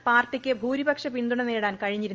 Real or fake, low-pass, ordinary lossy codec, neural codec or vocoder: real; 7.2 kHz; Opus, 24 kbps; none